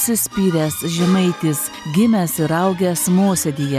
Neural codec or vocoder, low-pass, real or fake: none; 14.4 kHz; real